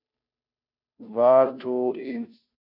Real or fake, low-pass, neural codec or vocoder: fake; 5.4 kHz; codec, 16 kHz, 0.5 kbps, FunCodec, trained on Chinese and English, 25 frames a second